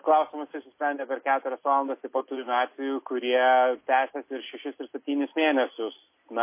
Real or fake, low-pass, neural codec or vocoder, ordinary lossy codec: real; 3.6 kHz; none; MP3, 24 kbps